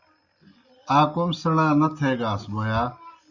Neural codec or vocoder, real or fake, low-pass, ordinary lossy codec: none; real; 7.2 kHz; Opus, 64 kbps